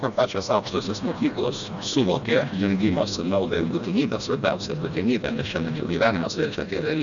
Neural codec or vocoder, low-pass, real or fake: codec, 16 kHz, 1 kbps, FreqCodec, smaller model; 7.2 kHz; fake